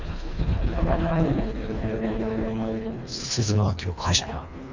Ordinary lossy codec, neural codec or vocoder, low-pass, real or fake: MP3, 64 kbps; codec, 24 kHz, 1.5 kbps, HILCodec; 7.2 kHz; fake